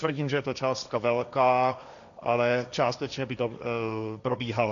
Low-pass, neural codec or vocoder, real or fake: 7.2 kHz; codec, 16 kHz, 1.1 kbps, Voila-Tokenizer; fake